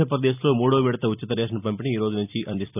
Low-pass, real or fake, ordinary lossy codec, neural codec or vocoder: 3.6 kHz; fake; none; vocoder, 44.1 kHz, 128 mel bands every 256 samples, BigVGAN v2